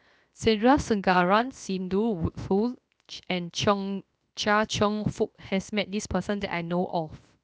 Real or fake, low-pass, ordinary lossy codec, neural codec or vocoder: fake; none; none; codec, 16 kHz, 0.7 kbps, FocalCodec